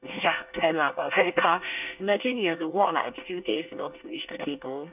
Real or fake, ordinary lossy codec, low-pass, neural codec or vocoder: fake; none; 3.6 kHz; codec, 24 kHz, 1 kbps, SNAC